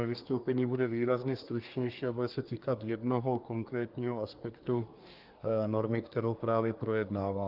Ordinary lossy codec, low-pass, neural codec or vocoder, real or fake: Opus, 24 kbps; 5.4 kHz; codec, 24 kHz, 1 kbps, SNAC; fake